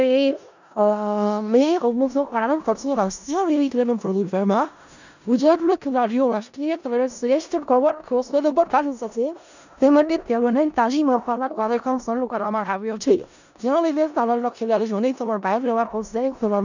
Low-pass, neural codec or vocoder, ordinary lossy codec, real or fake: 7.2 kHz; codec, 16 kHz in and 24 kHz out, 0.4 kbps, LongCat-Audio-Codec, four codebook decoder; none; fake